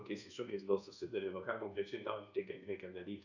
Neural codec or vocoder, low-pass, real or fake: codec, 24 kHz, 1.2 kbps, DualCodec; 7.2 kHz; fake